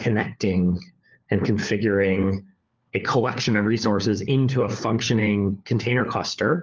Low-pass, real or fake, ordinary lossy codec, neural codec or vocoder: 7.2 kHz; fake; Opus, 24 kbps; codec, 16 kHz, 4 kbps, FunCodec, trained on LibriTTS, 50 frames a second